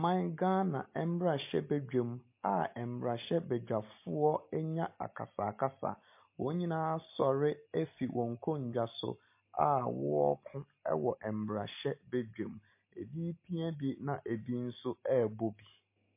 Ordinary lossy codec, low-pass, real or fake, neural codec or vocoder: MP3, 24 kbps; 3.6 kHz; real; none